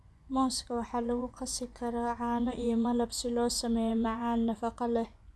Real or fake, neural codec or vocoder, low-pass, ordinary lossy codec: fake; vocoder, 24 kHz, 100 mel bands, Vocos; none; none